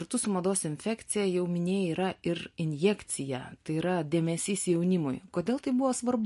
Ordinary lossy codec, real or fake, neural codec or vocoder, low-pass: MP3, 48 kbps; real; none; 14.4 kHz